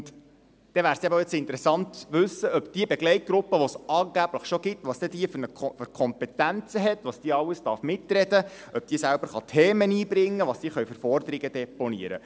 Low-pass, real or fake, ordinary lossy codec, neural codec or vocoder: none; real; none; none